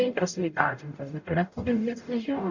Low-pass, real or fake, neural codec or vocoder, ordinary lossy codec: 7.2 kHz; fake; codec, 44.1 kHz, 0.9 kbps, DAC; none